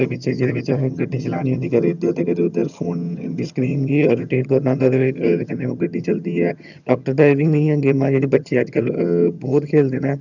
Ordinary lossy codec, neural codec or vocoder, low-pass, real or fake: none; vocoder, 22.05 kHz, 80 mel bands, HiFi-GAN; 7.2 kHz; fake